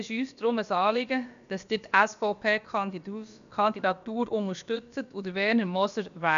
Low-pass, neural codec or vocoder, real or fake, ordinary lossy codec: 7.2 kHz; codec, 16 kHz, about 1 kbps, DyCAST, with the encoder's durations; fake; none